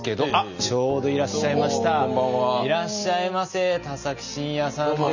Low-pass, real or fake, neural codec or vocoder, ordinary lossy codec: 7.2 kHz; real; none; none